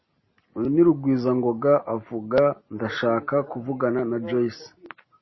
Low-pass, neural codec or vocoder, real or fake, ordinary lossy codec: 7.2 kHz; none; real; MP3, 24 kbps